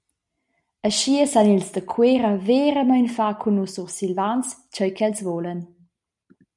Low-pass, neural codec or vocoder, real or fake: 10.8 kHz; none; real